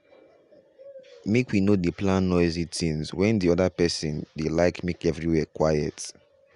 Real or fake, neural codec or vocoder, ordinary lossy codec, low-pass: real; none; Opus, 64 kbps; 10.8 kHz